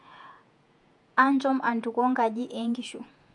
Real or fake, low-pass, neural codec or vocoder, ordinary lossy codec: fake; 10.8 kHz; vocoder, 48 kHz, 128 mel bands, Vocos; MP3, 64 kbps